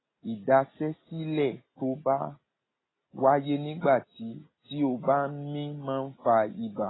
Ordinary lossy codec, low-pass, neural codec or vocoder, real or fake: AAC, 16 kbps; 7.2 kHz; none; real